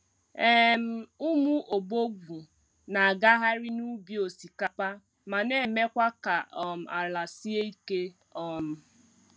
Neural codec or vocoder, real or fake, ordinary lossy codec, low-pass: none; real; none; none